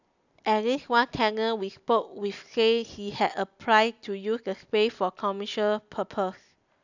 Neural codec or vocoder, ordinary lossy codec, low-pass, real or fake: none; none; 7.2 kHz; real